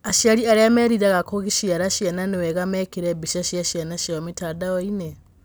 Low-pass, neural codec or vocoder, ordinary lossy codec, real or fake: none; none; none; real